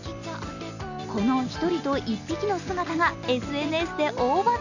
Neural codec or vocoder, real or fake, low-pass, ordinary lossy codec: none; real; 7.2 kHz; none